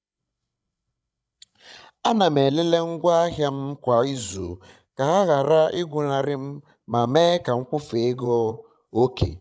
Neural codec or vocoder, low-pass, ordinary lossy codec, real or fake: codec, 16 kHz, 16 kbps, FreqCodec, larger model; none; none; fake